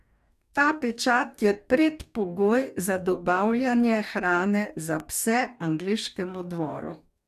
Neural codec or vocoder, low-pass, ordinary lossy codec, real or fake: codec, 44.1 kHz, 2.6 kbps, DAC; 14.4 kHz; none; fake